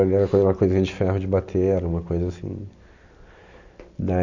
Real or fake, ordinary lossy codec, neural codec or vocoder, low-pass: real; none; none; 7.2 kHz